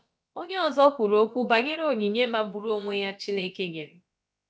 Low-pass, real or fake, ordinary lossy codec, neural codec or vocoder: none; fake; none; codec, 16 kHz, about 1 kbps, DyCAST, with the encoder's durations